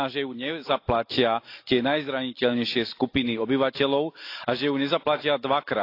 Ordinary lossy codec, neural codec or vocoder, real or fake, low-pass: AAC, 32 kbps; none; real; 5.4 kHz